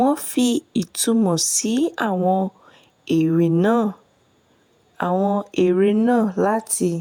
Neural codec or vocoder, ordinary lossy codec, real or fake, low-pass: vocoder, 48 kHz, 128 mel bands, Vocos; none; fake; none